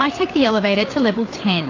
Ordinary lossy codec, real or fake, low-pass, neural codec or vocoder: AAC, 32 kbps; fake; 7.2 kHz; codec, 16 kHz, 8 kbps, FreqCodec, larger model